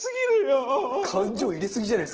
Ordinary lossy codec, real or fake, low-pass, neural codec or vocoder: Opus, 16 kbps; real; 7.2 kHz; none